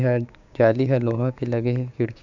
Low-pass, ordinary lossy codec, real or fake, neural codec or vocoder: 7.2 kHz; none; fake; codec, 16 kHz, 6 kbps, DAC